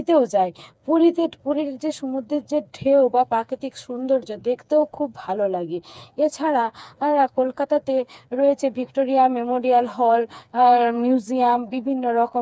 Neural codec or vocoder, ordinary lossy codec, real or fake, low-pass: codec, 16 kHz, 4 kbps, FreqCodec, smaller model; none; fake; none